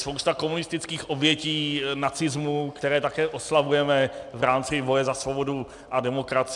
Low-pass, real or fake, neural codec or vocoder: 10.8 kHz; real; none